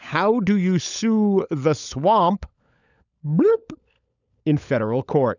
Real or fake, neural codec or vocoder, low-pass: fake; codec, 16 kHz, 16 kbps, FunCodec, trained on LibriTTS, 50 frames a second; 7.2 kHz